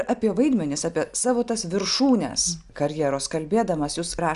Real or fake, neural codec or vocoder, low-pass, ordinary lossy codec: real; none; 10.8 kHz; Opus, 64 kbps